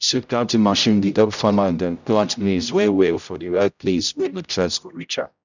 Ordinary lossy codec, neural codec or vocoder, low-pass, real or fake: none; codec, 16 kHz, 0.5 kbps, X-Codec, HuBERT features, trained on general audio; 7.2 kHz; fake